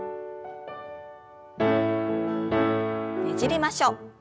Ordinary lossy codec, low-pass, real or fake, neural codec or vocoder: none; none; real; none